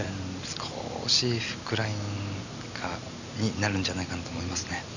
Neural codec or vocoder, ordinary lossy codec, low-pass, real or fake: none; none; 7.2 kHz; real